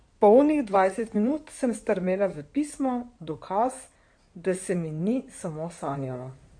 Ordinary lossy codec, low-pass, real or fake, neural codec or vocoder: MP3, 48 kbps; 9.9 kHz; fake; codec, 16 kHz in and 24 kHz out, 2.2 kbps, FireRedTTS-2 codec